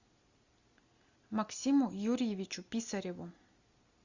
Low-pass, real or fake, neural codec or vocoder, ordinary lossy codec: 7.2 kHz; real; none; Opus, 64 kbps